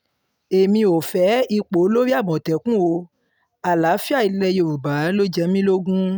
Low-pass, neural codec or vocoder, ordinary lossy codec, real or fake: none; none; none; real